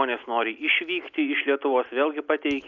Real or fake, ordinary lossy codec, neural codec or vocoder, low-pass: real; Opus, 64 kbps; none; 7.2 kHz